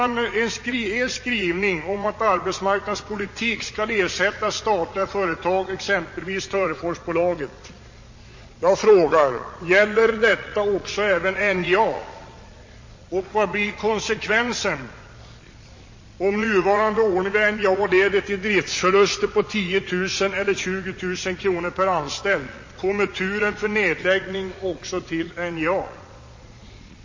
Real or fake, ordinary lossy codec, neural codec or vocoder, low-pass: fake; MP3, 32 kbps; vocoder, 22.05 kHz, 80 mel bands, Vocos; 7.2 kHz